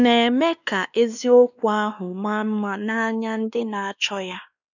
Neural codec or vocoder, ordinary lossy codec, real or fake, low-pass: codec, 16 kHz, 2 kbps, X-Codec, WavLM features, trained on Multilingual LibriSpeech; none; fake; 7.2 kHz